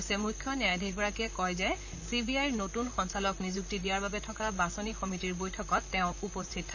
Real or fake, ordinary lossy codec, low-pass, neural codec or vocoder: fake; none; 7.2 kHz; vocoder, 44.1 kHz, 128 mel bands, Pupu-Vocoder